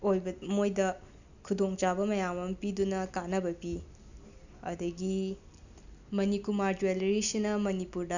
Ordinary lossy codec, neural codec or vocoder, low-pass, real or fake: none; none; 7.2 kHz; real